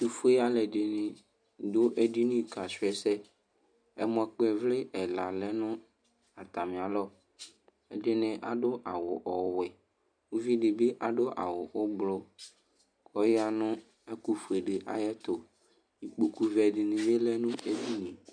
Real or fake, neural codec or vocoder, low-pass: real; none; 9.9 kHz